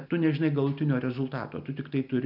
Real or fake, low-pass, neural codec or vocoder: real; 5.4 kHz; none